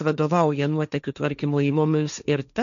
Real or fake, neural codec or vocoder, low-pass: fake; codec, 16 kHz, 1.1 kbps, Voila-Tokenizer; 7.2 kHz